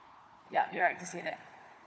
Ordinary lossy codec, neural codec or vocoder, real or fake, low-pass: none; codec, 16 kHz, 4 kbps, FunCodec, trained on Chinese and English, 50 frames a second; fake; none